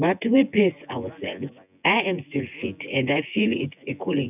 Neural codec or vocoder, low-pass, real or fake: vocoder, 24 kHz, 100 mel bands, Vocos; 3.6 kHz; fake